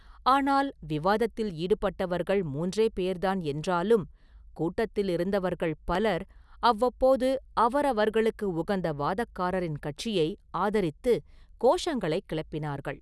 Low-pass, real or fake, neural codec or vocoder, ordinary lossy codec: none; real; none; none